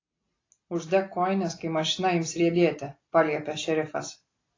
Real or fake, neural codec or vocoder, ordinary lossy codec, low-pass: real; none; AAC, 32 kbps; 7.2 kHz